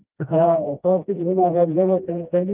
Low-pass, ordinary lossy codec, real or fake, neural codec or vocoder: 3.6 kHz; Opus, 24 kbps; fake; codec, 16 kHz, 1 kbps, FreqCodec, smaller model